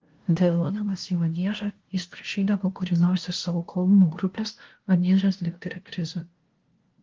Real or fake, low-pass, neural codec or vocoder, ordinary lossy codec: fake; 7.2 kHz; codec, 16 kHz, 0.5 kbps, FunCodec, trained on LibriTTS, 25 frames a second; Opus, 16 kbps